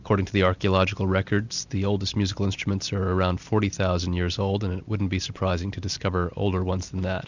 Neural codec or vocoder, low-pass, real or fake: none; 7.2 kHz; real